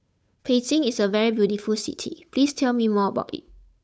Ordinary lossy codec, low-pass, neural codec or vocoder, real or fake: none; none; codec, 16 kHz, 8 kbps, FunCodec, trained on Chinese and English, 25 frames a second; fake